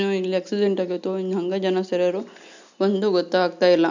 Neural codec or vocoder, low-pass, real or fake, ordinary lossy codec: none; 7.2 kHz; real; none